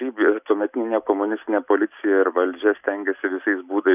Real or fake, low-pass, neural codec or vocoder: real; 3.6 kHz; none